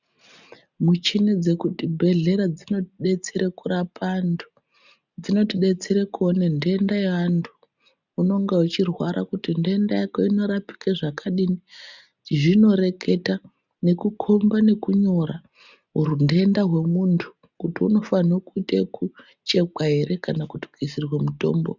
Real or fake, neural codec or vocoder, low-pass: real; none; 7.2 kHz